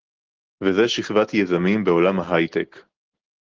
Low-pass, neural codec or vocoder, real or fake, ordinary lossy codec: 7.2 kHz; none; real; Opus, 16 kbps